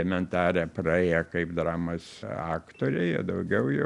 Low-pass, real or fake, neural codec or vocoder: 10.8 kHz; real; none